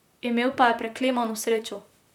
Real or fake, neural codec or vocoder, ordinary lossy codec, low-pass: fake; vocoder, 44.1 kHz, 128 mel bands, Pupu-Vocoder; none; 19.8 kHz